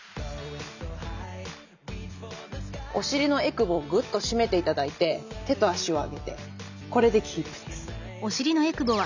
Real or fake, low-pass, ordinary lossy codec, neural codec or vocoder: real; 7.2 kHz; none; none